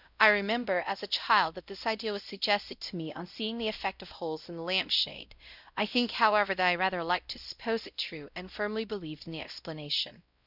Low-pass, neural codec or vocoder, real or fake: 5.4 kHz; codec, 16 kHz, 0.5 kbps, X-Codec, WavLM features, trained on Multilingual LibriSpeech; fake